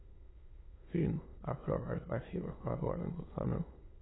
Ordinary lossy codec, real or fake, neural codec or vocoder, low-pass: AAC, 16 kbps; fake; autoencoder, 22.05 kHz, a latent of 192 numbers a frame, VITS, trained on many speakers; 7.2 kHz